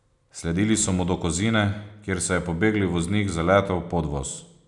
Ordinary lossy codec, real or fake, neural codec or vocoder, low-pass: none; real; none; 10.8 kHz